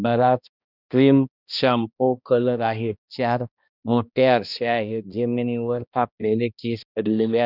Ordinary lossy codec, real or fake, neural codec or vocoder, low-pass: none; fake; codec, 16 kHz, 1 kbps, X-Codec, HuBERT features, trained on balanced general audio; 5.4 kHz